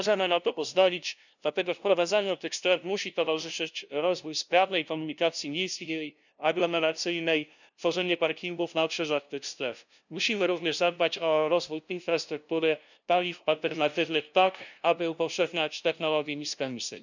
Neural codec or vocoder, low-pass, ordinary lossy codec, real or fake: codec, 16 kHz, 0.5 kbps, FunCodec, trained on LibriTTS, 25 frames a second; 7.2 kHz; none; fake